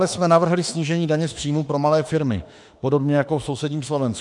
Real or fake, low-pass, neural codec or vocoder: fake; 10.8 kHz; autoencoder, 48 kHz, 32 numbers a frame, DAC-VAE, trained on Japanese speech